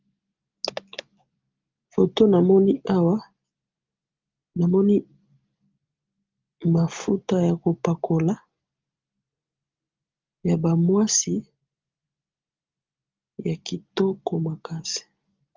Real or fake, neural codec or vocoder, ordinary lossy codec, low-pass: real; none; Opus, 32 kbps; 7.2 kHz